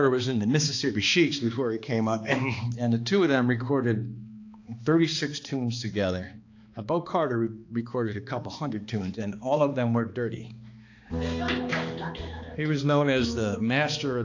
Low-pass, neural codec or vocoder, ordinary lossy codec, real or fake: 7.2 kHz; codec, 16 kHz, 2 kbps, X-Codec, HuBERT features, trained on balanced general audio; AAC, 48 kbps; fake